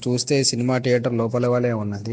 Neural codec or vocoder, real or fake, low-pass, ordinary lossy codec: none; real; none; none